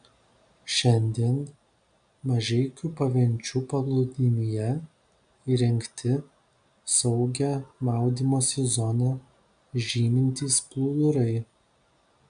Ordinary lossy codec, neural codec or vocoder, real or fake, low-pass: AAC, 96 kbps; none; real; 9.9 kHz